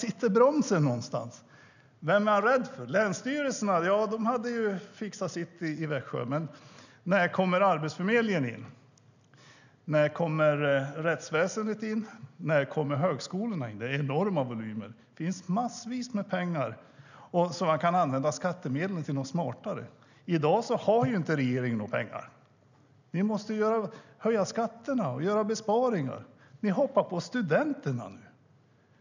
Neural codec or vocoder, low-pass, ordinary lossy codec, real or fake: none; 7.2 kHz; none; real